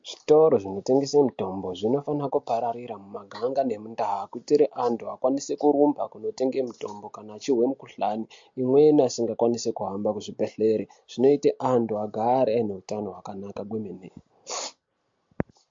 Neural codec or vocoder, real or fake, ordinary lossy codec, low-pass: none; real; MP3, 48 kbps; 7.2 kHz